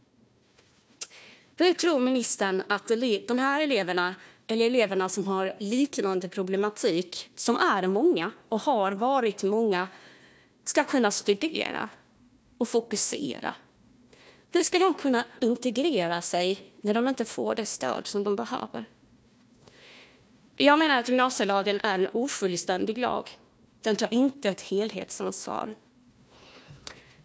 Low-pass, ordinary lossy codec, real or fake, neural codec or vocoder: none; none; fake; codec, 16 kHz, 1 kbps, FunCodec, trained on Chinese and English, 50 frames a second